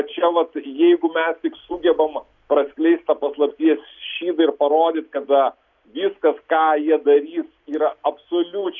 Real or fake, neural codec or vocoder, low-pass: real; none; 7.2 kHz